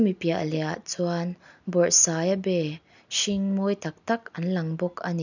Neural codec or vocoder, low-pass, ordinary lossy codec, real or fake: none; 7.2 kHz; none; real